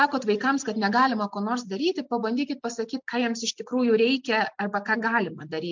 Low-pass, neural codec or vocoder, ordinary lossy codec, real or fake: 7.2 kHz; none; MP3, 64 kbps; real